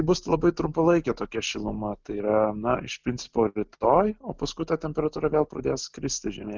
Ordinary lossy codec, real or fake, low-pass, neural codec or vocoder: Opus, 32 kbps; real; 7.2 kHz; none